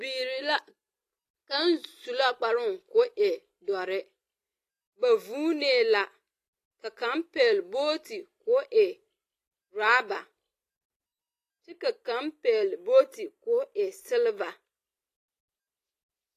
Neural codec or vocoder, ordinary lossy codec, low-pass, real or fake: none; AAC, 48 kbps; 14.4 kHz; real